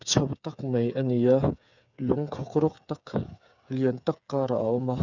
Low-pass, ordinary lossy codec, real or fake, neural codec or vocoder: 7.2 kHz; none; fake; codec, 16 kHz, 8 kbps, FreqCodec, smaller model